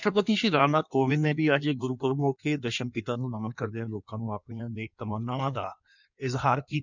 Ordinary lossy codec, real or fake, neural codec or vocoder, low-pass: none; fake; codec, 16 kHz in and 24 kHz out, 1.1 kbps, FireRedTTS-2 codec; 7.2 kHz